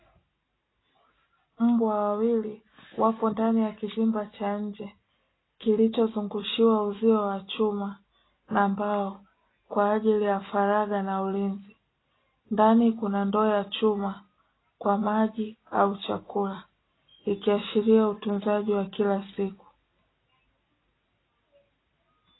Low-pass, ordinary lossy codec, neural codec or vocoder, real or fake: 7.2 kHz; AAC, 16 kbps; none; real